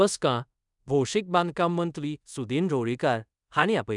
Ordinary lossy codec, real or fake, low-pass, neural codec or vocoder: none; fake; 10.8 kHz; codec, 24 kHz, 0.5 kbps, DualCodec